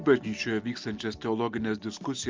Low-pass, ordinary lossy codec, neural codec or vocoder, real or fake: 7.2 kHz; Opus, 24 kbps; none; real